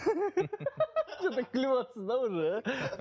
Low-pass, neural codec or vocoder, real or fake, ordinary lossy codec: none; none; real; none